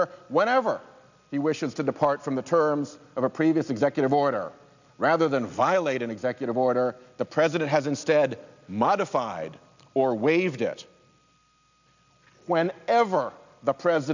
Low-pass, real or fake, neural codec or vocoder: 7.2 kHz; real; none